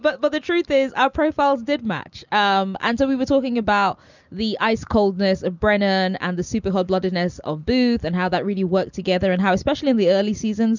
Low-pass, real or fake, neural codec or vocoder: 7.2 kHz; real; none